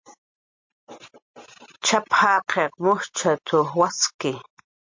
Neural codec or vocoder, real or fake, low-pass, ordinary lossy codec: none; real; 7.2 kHz; MP3, 48 kbps